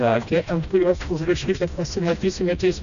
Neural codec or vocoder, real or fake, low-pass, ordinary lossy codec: codec, 16 kHz, 1 kbps, FreqCodec, smaller model; fake; 7.2 kHz; MP3, 96 kbps